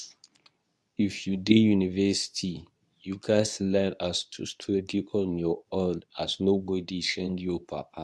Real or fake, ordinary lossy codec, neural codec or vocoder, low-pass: fake; none; codec, 24 kHz, 0.9 kbps, WavTokenizer, medium speech release version 2; none